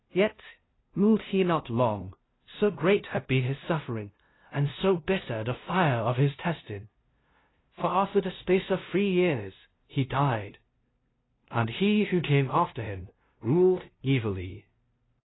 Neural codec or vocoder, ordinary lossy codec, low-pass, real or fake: codec, 16 kHz, 0.5 kbps, FunCodec, trained on LibriTTS, 25 frames a second; AAC, 16 kbps; 7.2 kHz; fake